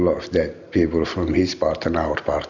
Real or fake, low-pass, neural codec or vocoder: real; 7.2 kHz; none